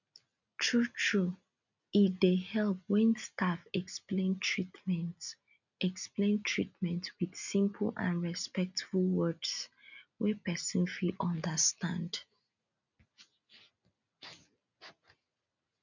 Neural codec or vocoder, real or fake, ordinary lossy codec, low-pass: none; real; none; 7.2 kHz